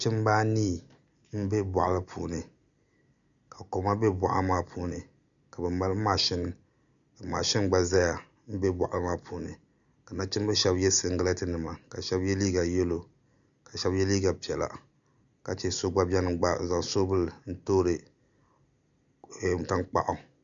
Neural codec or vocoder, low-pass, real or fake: none; 7.2 kHz; real